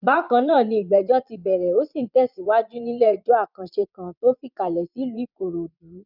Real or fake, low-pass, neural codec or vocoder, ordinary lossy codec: fake; 5.4 kHz; vocoder, 22.05 kHz, 80 mel bands, Vocos; none